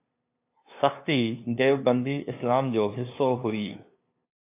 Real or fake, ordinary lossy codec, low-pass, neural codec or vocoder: fake; AAC, 24 kbps; 3.6 kHz; codec, 16 kHz, 2 kbps, FunCodec, trained on LibriTTS, 25 frames a second